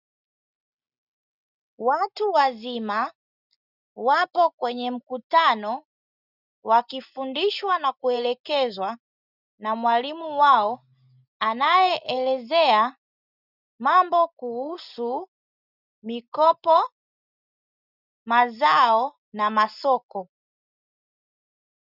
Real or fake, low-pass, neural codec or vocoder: real; 5.4 kHz; none